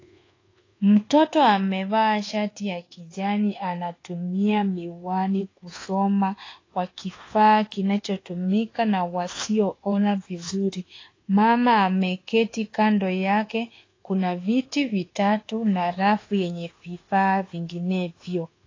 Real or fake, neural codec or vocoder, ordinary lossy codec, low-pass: fake; codec, 24 kHz, 1.2 kbps, DualCodec; AAC, 32 kbps; 7.2 kHz